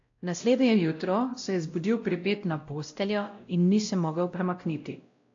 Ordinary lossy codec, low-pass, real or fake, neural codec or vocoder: AAC, 48 kbps; 7.2 kHz; fake; codec, 16 kHz, 0.5 kbps, X-Codec, WavLM features, trained on Multilingual LibriSpeech